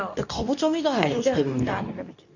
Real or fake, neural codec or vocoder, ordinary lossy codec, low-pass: fake; codec, 24 kHz, 0.9 kbps, WavTokenizer, medium speech release version 2; none; 7.2 kHz